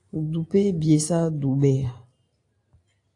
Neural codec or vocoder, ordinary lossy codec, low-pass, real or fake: none; AAC, 48 kbps; 10.8 kHz; real